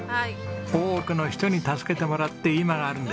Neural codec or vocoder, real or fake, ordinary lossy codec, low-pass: none; real; none; none